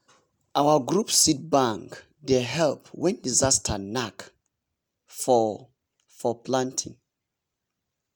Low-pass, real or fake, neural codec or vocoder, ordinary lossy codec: none; real; none; none